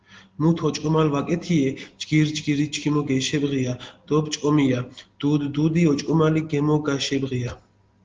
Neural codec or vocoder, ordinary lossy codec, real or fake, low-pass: none; Opus, 16 kbps; real; 7.2 kHz